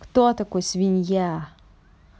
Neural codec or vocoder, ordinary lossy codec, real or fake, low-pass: none; none; real; none